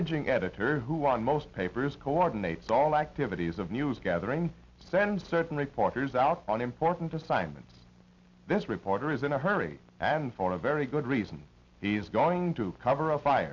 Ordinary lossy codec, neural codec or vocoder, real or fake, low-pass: MP3, 64 kbps; none; real; 7.2 kHz